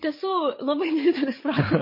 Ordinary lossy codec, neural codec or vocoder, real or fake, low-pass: MP3, 24 kbps; codec, 16 kHz, 8 kbps, FreqCodec, larger model; fake; 5.4 kHz